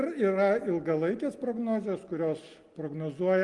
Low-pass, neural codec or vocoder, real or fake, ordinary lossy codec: 10.8 kHz; none; real; Opus, 24 kbps